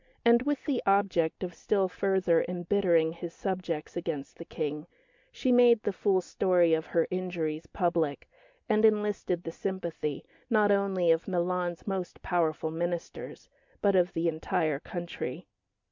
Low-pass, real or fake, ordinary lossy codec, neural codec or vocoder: 7.2 kHz; real; MP3, 64 kbps; none